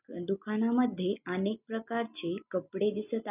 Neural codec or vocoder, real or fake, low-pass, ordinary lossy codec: none; real; 3.6 kHz; none